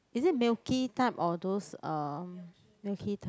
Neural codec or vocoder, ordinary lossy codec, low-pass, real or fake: none; none; none; real